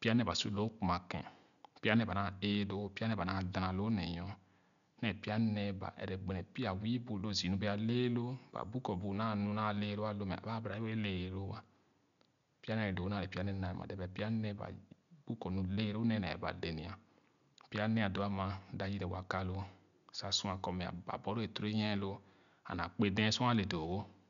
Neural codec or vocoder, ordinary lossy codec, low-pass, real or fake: none; none; 7.2 kHz; real